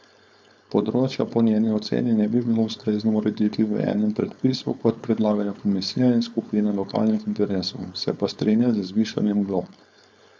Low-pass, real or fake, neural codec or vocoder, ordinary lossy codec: none; fake; codec, 16 kHz, 4.8 kbps, FACodec; none